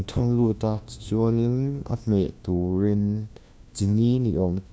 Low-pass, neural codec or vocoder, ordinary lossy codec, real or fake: none; codec, 16 kHz, 1 kbps, FunCodec, trained on LibriTTS, 50 frames a second; none; fake